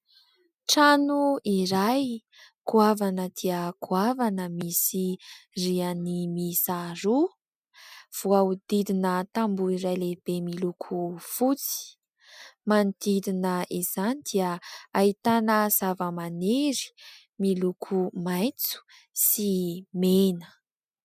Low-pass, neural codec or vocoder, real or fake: 14.4 kHz; none; real